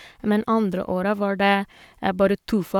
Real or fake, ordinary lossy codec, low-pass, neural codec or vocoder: fake; none; 19.8 kHz; codec, 44.1 kHz, 7.8 kbps, Pupu-Codec